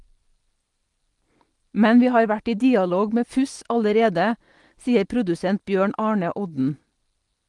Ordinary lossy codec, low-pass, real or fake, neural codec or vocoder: Opus, 24 kbps; 10.8 kHz; real; none